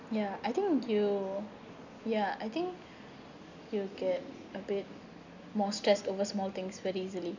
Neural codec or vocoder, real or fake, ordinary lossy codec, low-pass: none; real; none; 7.2 kHz